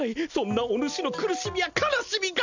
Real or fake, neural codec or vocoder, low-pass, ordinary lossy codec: real; none; 7.2 kHz; none